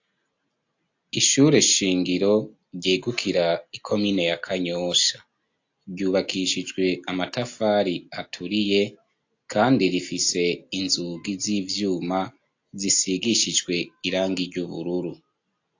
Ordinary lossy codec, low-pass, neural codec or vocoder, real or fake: AAC, 48 kbps; 7.2 kHz; none; real